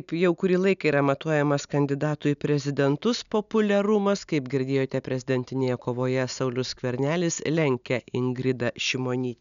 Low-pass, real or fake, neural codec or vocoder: 7.2 kHz; real; none